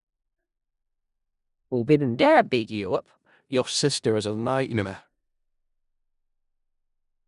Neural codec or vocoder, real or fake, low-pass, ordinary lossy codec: codec, 16 kHz in and 24 kHz out, 0.4 kbps, LongCat-Audio-Codec, four codebook decoder; fake; 10.8 kHz; none